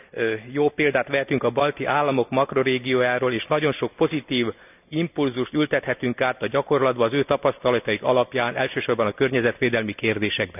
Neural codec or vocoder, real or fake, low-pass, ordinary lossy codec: none; real; 3.6 kHz; none